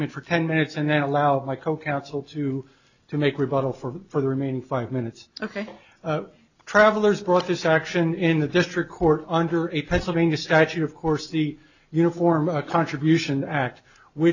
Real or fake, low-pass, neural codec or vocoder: real; 7.2 kHz; none